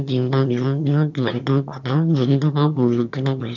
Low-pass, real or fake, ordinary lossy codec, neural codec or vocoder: 7.2 kHz; fake; none; autoencoder, 22.05 kHz, a latent of 192 numbers a frame, VITS, trained on one speaker